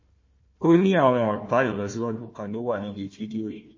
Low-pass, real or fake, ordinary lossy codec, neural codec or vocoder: 7.2 kHz; fake; MP3, 32 kbps; codec, 16 kHz, 1 kbps, FunCodec, trained on Chinese and English, 50 frames a second